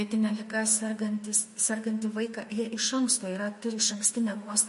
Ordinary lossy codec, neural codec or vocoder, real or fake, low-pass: MP3, 48 kbps; autoencoder, 48 kHz, 32 numbers a frame, DAC-VAE, trained on Japanese speech; fake; 14.4 kHz